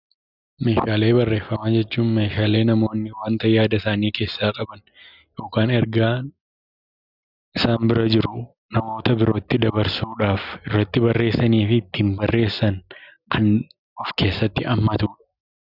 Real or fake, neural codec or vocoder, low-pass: real; none; 5.4 kHz